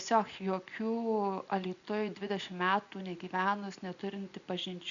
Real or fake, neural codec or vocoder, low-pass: real; none; 7.2 kHz